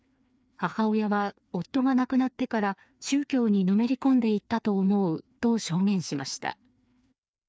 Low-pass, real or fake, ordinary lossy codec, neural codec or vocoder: none; fake; none; codec, 16 kHz, 2 kbps, FreqCodec, larger model